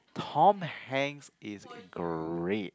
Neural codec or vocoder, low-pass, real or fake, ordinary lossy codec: none; none; real; none